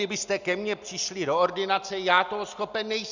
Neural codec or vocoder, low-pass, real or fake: none; 7.2 kHz; real